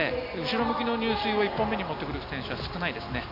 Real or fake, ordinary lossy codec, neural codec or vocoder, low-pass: real; none; none; 5.4 kHz